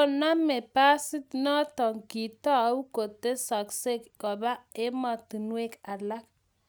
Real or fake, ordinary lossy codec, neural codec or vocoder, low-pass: real; none; none; none